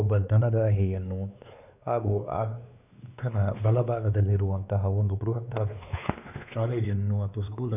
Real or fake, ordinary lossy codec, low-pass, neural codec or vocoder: fake; none; 3.6 kHz; codec, 16 kHz, 2 kbps, X-Codec, WavLM features, trained on Multilingual LibriSpeech